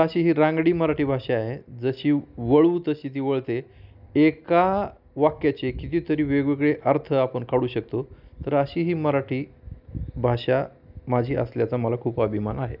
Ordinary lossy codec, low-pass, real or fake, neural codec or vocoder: none; 5.4 kHz; real; none